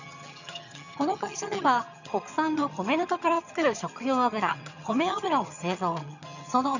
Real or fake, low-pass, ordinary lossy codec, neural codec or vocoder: fake; 7.2 kHz; none; vocoder, 22.05 kHz, 80 mel bands, HiFi-GAN